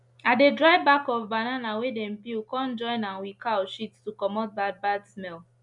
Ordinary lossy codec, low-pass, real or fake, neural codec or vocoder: none; 10.8 kHz; real; none